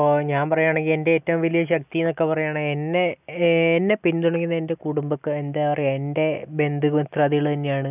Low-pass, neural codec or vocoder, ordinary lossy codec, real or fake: 3.6 kHz; none; none; real